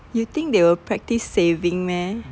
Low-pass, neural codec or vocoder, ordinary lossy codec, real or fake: none; none; none; real